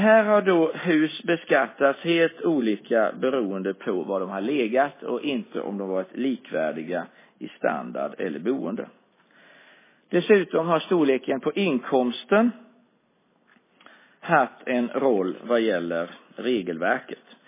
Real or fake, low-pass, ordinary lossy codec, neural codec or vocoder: real; 3.6 kHz; MP3, 16 kbps; none